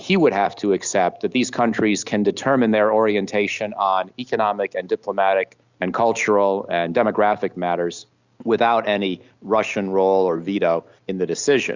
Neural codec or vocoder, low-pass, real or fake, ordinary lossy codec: none; 7.2 kHz; real; Opus, 64 kbps